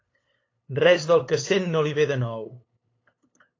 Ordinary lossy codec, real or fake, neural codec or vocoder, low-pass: AAC, 32 kbps; fake; codec, 16 kHz, 8 kbps, FunCodec, trained on LibriTTS, 25 frames a second; 7.2 kHz